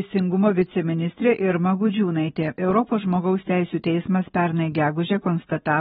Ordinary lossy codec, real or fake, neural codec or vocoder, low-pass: AAC, 16 kbps; real; none; 19.8 kHz